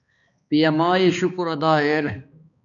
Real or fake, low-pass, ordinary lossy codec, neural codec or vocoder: fake; 7.2 kHz; MP3, 96 kbps; codec, 16 kHz, 2 kbps, X-Codec, HuBERT features, trained on balanced general audio